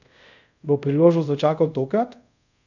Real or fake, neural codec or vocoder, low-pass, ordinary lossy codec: fake; codec, 24 kHz, 0.5 kbps, DualCodec; 7.2 kHz; none